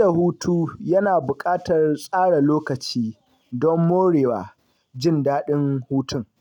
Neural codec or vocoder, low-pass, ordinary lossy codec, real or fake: none; 19.8 kHz; none; real